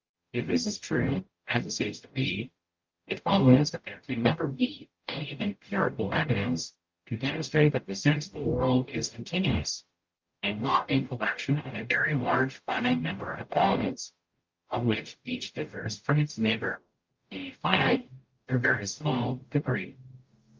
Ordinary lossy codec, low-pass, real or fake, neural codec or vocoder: Opus, 24 kbps; 7.2 kHz; fake; codec, 44.1 kHz, 0.9 kbps, DAC